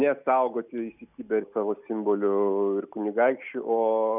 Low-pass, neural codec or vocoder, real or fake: 3.6 kHz; none; real